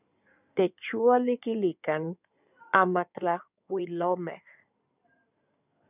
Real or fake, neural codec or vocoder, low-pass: fake; codec, 16 kHz in and 24 kHz out, 2.2 kbps, FireRedTTS-2 codec; 3.6 kHz